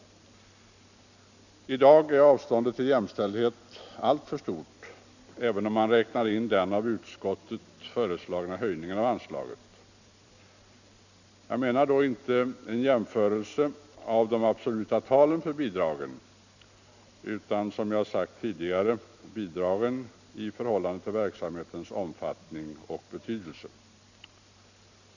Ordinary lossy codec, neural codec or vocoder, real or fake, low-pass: none; none; real; 7.2 kHz